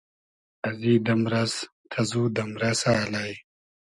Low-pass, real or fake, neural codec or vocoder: 10.8 kHz; real; none